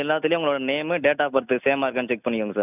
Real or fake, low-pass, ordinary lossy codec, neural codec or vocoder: real; 3.6 kHz; none; none